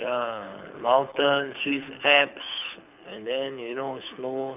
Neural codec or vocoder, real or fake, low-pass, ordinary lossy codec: codec, 24 kHz, 6 kbps, HILCodec; fake; 3.6 kHz; none